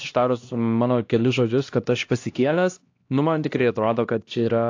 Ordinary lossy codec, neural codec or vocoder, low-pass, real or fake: AAC, 48 kbps; codec, 16 kHz, 1 kbps, X-Codec, HuBERT features, trained on LibriSpeech; 7.2 kHz; fake